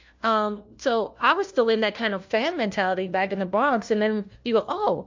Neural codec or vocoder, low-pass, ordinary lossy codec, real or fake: codec, 16 kHz, 1 kbps, FunCodec, trained on LibriTTS, 50 frames a second; 7.2 kHz; MP3, 48 kbps; fake